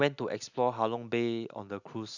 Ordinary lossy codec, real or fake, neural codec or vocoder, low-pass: none; real; none; 7.2 kHz